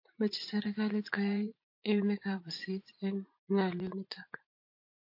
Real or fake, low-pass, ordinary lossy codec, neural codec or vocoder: fake; 5.4 kHz; MP3, 32 kbps; vocoder, 44.1 kHz, 80 mel bands, Vocos